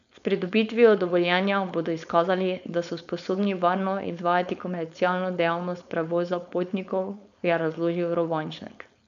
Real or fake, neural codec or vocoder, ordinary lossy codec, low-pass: fake; codec, 16 kHz, 4.8 kbps, FACodec; none; 7.2 kHz